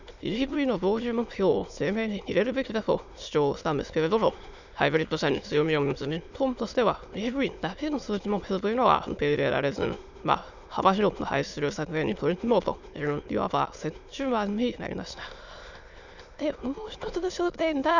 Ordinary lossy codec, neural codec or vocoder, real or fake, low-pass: none; autoencoder, 22.05 kHz, a latent of 192 numbers a frame, VITS, trained on many speakers; fake; 7.2 kHz